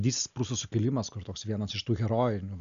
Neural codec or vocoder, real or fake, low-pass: none; real; 7.2 kHz